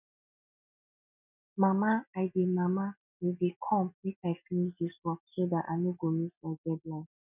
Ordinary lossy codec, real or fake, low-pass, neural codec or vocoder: none; real; 3.6 kHz; none